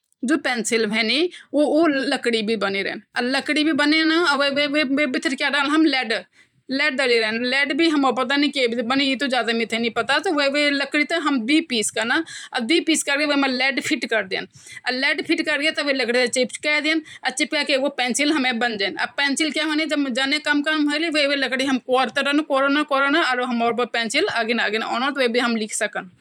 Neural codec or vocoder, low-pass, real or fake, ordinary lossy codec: vocoder, 44.1 kHz, 128 mel bands every 512 samples, BigVGAN v2; 19.8 kHz; fake; none